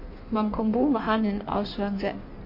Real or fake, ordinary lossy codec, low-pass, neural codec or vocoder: fake; MP3, 32 kbps; 5.4 kHz; codec, 16 kHz in and 24 kHz out, 1.1 kbps, FireRedTTS-2 codec